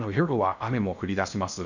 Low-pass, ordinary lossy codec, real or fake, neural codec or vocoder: 7.2 kHz; none; fake; codec, 16 kHz in and 24 kHz out, 0.6 kbps, FocalCodec, streaming, 2048 codes